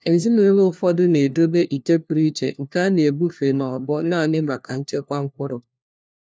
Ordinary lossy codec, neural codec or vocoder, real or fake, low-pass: none; codec, 16 kHz, 1 kbps, FunCodec, trained on LibriTTS, 50 frames a second; fake; none